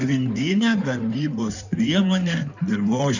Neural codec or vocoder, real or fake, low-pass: codec, 16 kHz in and 24 kHz out, 2.2 kbps, FireRedTTS-2 codec; fake; 7.2 kHz